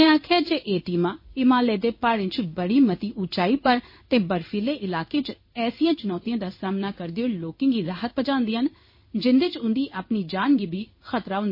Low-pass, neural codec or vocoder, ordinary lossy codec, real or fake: 5.4 kHz; codec, 16 kHz in and 24 kHz out, 1 kbps, XY-Tokenizer; MP3, 24 kbps; fake